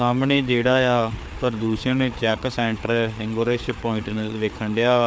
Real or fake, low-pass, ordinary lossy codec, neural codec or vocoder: fake; none; none; codec, 16 kHz, 4 kbps, FreqCodec, larger model